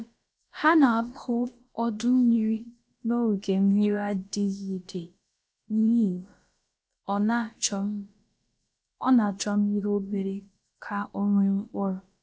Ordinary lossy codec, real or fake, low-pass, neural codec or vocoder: none; fake; none; codec, 16 kHz, about 1 kbps, DyCAST, with the encoder's durations